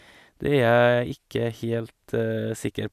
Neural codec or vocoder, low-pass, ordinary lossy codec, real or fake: none; 14.4 kHz; none; real